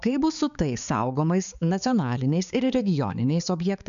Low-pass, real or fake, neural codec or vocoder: 7.2 kHz; fake; codec, 16 kHz, 4 kbps, X-Codec, HuBERT features, trained on balanced general audio